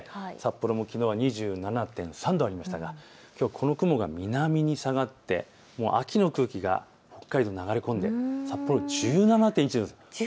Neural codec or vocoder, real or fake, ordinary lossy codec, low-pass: none; real; none; none